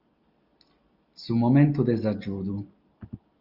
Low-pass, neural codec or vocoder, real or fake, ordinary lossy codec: 5.4 kHz; none; real; Opus, 32 kbps